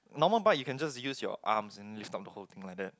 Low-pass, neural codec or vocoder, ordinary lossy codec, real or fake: none; none; none; real